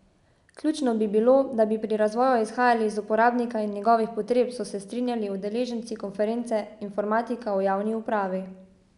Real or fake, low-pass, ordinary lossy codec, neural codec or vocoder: real; 10.8 kHz; none; none